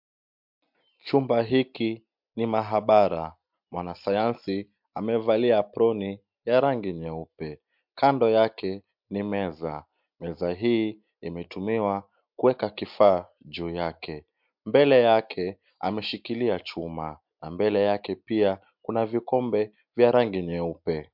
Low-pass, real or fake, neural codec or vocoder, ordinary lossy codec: 5.4 kHz; real; none; MP3, 48 kbps